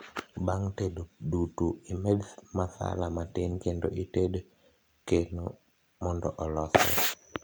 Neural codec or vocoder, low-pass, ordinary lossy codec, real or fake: none; none; none; real